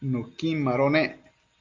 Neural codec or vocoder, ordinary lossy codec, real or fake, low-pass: none; Opus, 32 kbps; real; 7.2 kHz